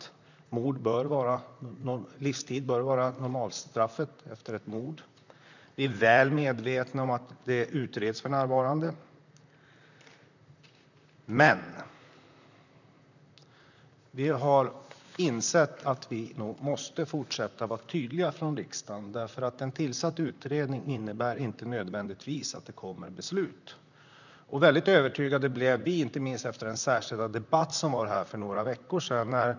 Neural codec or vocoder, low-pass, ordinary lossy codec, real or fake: vocoder, 44.1 kHz, 128 mel bands, Pupu-Vocoder; 7.2 kHz; none; fake